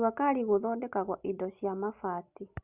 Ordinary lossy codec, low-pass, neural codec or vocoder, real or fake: Opus, 64 kbps; 3.6 kHz; none; real